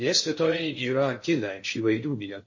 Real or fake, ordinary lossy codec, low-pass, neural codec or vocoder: fake; MP3, 32 kbps; 7.2 kHz; codec, 16 kHz in and 24 kHz out, 0.6 kbps, FocalCodec, streaming, 2048 codes